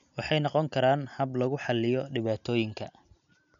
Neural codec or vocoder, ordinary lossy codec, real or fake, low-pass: none; none; real; 7.2 kHz